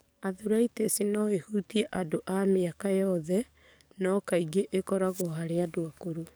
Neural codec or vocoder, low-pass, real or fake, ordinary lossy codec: codec, 44.1 kHz, 7.8 kbps, DAC; none; fake; none